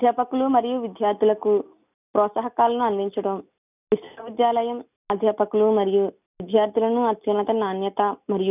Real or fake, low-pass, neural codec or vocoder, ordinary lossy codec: real; 3.6 kHz; none; none